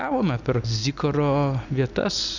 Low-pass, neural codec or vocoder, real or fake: 7.2 kHz; none; real